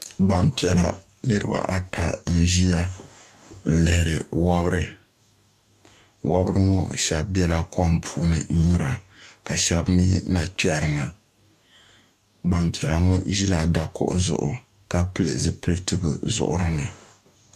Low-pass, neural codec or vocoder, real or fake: 14.4 kHz; codec, 44.1 kHz, 2.6 kbps, DAC; fake